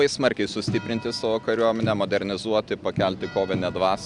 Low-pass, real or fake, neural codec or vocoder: 10.8 kHz; real; none